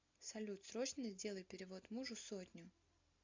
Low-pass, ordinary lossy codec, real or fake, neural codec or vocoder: 7.2 kHz; MP3, 64 kbps; real; none